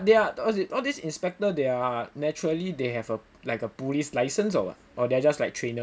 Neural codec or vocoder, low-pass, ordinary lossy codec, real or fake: none; none; none; real